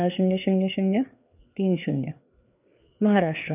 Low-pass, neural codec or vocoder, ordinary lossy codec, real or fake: 3.6 kHz; codec, 16 kHz, 4 kbps, FreqCodec, larger model; none; fake